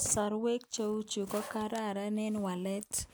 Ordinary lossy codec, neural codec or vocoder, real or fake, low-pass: none; none; real; none